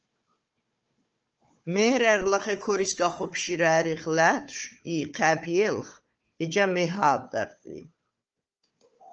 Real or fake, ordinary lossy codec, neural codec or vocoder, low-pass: fake; Opus, 24 kbps; codec, 16 kHz, 4 kbps, FunCodec, trained on Chinese and English, 50 frames a second; 7.2 kHz